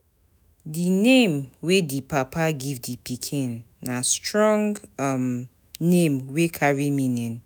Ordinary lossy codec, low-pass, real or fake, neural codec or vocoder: none; none; fake; autoencoder, 48 kHz, 128 numbers a frame, DAC-VAE, trained on Japanese speech